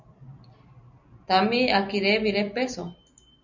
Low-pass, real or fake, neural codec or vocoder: 7.2 kHz; real; none